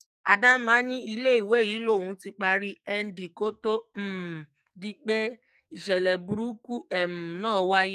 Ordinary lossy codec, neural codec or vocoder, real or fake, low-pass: none; codec, 44.1 kHz, 2.6 kbps, SNAC; fake; 14.4 kHz